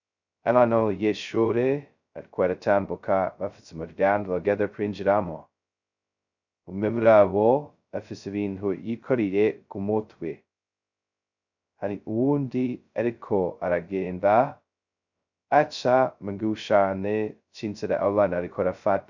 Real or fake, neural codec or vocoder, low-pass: fake; codec, 16 kHz, 0.2 kbps, FocalCodec; 7.2 kHz